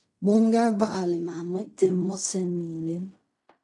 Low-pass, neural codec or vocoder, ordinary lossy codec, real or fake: 10.8 kHz; codec, 16 kHz in and 24 kHz out, 0.4 kbps, LongCat-Audio-Codec, fine tuned four codebook decoder; AAC, 64 kbps; fake